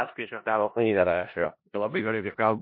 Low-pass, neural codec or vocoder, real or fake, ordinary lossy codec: 5.4 kHz; codec, 16 kHz in and 24 kHz out, 0.4 kbps, LongCat-Audio-Codec, four codebook decoder; fake; MP3, 32 kbps